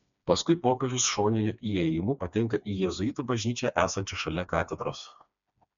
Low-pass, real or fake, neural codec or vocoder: 7.2 kHz; fake; codec, 16 kHz, 2 kbps, FreqCodec, smaller model